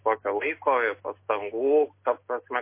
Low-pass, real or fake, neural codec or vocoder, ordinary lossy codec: 3.6 kHz; real; none; MP3, 24 kbps